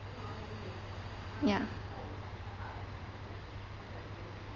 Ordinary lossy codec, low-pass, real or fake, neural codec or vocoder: Opus, 32 kbps; 7.2 kHz; real; none